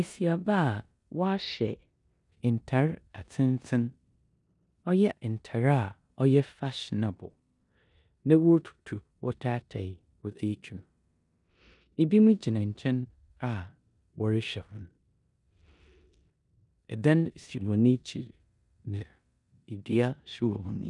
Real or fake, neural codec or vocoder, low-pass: fake; codec, 16 kHz in and 24 kHz out, 0.9 kbps, LongCat-Audio-Codec, four codebook decoder; 10.8 kHz